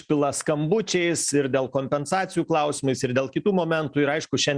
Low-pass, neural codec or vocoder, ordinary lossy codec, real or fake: 9.9 kHz; none; Opus, 64 kbps; real